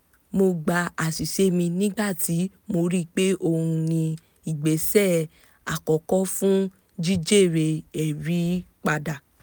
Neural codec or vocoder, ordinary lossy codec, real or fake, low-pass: none; none; real; none